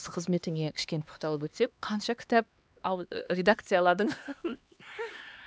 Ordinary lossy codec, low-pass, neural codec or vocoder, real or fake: none; none; codec, 16 kHz, 2 kbps, X-Codec, HuBERT features, trained on LibriSpeech; fake